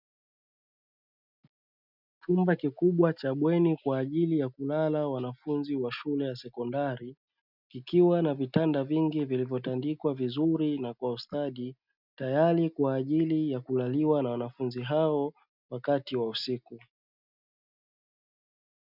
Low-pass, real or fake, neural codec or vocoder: 5.4 kHz; real; none